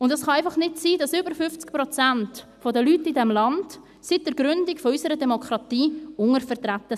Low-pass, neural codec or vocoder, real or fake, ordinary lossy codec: 14.4 kHz; none; real; none